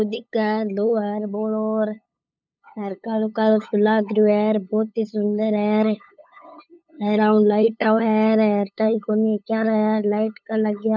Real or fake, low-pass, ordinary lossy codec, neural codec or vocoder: fake; none; none; codec, 16 kHz, 8 kbps, FunCodec, trained on LibriTTS, 25 frames a second